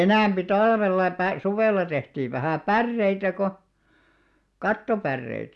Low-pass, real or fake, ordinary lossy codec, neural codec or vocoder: none; real; none; none